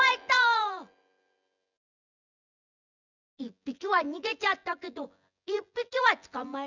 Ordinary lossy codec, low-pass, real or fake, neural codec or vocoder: none; 7.2 kHz; fake; vocoder, 24 kHz, 100 mel bands, Vocos